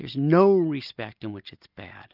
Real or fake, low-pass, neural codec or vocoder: real; 5.4 kHz; none